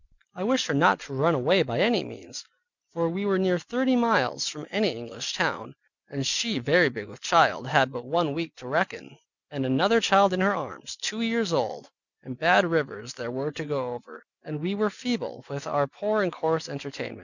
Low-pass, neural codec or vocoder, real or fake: 7.2 kHz; none; real